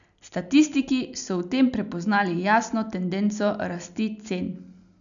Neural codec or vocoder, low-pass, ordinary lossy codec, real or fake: none; 7.2 kHz; none; real